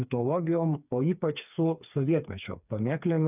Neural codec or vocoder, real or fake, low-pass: codec, 16 kHz, 4 kbps, FreqCodec, smaller model; fake; 3.6 kHz